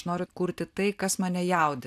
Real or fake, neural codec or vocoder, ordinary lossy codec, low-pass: real; none; AAC, 96 kbps; 14.4 kHz